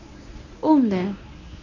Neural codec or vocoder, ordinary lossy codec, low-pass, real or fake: codec, 24 kHz, 0.9 kbps, WavTokenizer, medium speech release version 1; none; 7.2 kHz; fake